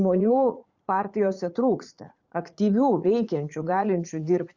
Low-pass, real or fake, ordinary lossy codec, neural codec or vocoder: 7.2 kHz; fake; Opus, 64 kbps; vocoder, 44.1 kHz, 80 mel bands, Vocos